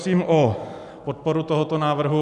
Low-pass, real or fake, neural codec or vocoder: 10.8 kHz; real; none